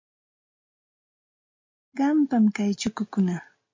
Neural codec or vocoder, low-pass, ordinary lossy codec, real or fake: none; 7.2 kHz; MP3, 48 kbps; real